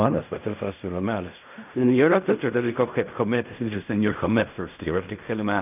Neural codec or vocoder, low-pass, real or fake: codec, 16 kHz in and 24 kHz out, 0.4 kbps, LongCat-Audio-Codec, fine tuned four codebook decoder; 3.6 kHz; fake